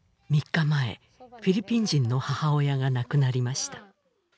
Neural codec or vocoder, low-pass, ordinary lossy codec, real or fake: none; none; none; real